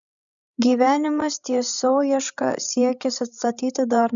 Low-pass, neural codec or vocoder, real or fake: 7.2 kHz; codec, 16 kHz, 16 kbps, FreqCodec, larger model; fake